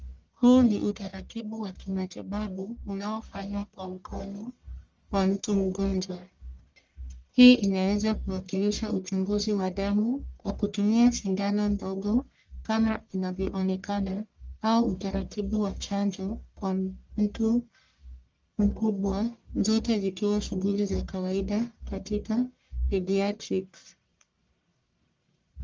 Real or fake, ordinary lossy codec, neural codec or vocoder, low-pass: fake; Opus, 32 kbps; codec, 44.1 kHz, 1.7 kbps, Pupu-Codec; 7.2 kHz